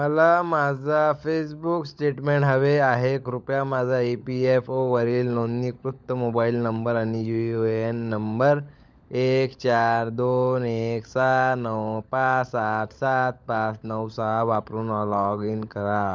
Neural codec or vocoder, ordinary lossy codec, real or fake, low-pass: codec, 16 kHz, 16 kbps, FunCodec, trained on LibriTTS, 50 frames a second; none; fake; none